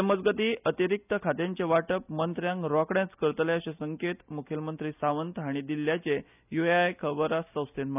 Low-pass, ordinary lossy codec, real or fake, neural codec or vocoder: 3.6 kHz; none; real; none